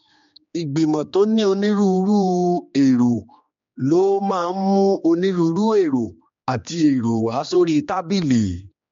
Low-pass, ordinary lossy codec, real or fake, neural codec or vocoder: 7.2 kHz; MP3, 48 kbps; fake; codec, 16 kHz, 2 kbps, X-Codec, HuBERT features, trained on general audio